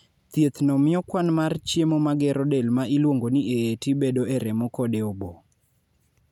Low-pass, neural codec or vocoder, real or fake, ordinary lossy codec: 19.8 kHz; none; real; none